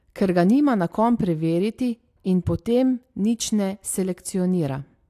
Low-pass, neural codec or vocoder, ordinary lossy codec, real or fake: 14.4 kHz; none; AAC, 64 kbps; real